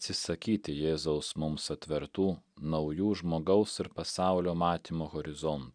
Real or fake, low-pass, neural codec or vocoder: real; 9.9 kHz; none